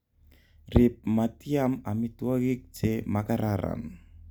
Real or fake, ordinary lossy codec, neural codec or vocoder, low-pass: real; none; none; none